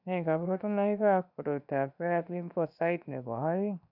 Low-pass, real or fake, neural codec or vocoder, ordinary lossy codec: 5.4 kHz; fake; codec, 16 kHz, 0.7 kbps, FocalCodec; none